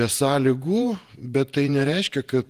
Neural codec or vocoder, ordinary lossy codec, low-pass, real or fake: vocoder, 48 kHz, 128 mel bands, Vocos; Opus, 24 kbps; 14.4 kHz; fake